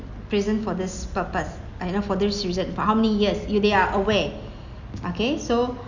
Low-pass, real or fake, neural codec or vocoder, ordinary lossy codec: 7.2 kHz; real; none; Opus, 64 kbps